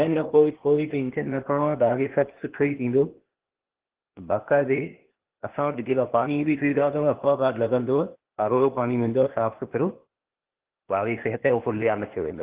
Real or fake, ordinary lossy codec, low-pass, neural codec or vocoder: fake; Opus, 16 kbps; 3.6 kHz; codec, 16 kHz, 0.8 kbps, ZipCodec